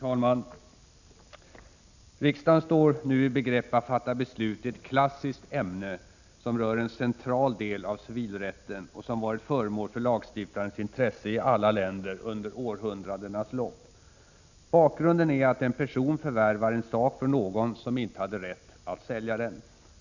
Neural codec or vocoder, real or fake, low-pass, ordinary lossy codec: none; real; 7.2 kHz; none